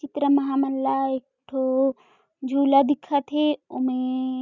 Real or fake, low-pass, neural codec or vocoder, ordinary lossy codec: real; 7.2 kHz; none; none